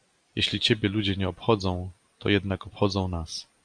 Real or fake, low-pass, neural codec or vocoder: real; 9.9 kHz; none